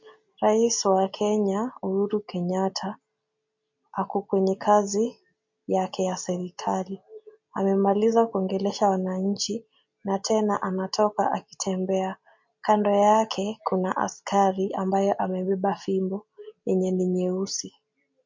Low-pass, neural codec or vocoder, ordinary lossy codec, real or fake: 7.2 kHz; none; MP3, 48 kbps; real